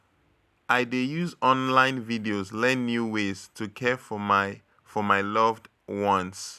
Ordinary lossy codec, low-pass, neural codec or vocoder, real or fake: none; 14.4 kHz; none; real